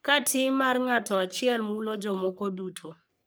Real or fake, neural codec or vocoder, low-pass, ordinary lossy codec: fake; codec, 44.1 kHz, 3.4 kbps, Pupu-Codec; none; none